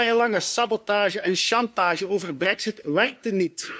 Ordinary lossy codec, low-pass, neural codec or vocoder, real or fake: none; none; codec, 16 kHz, 2 kbps, FunCodec, trained on LibriTTS, 25 frames a second; fake